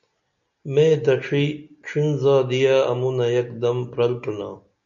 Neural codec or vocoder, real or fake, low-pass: none; real; 7.2 kHz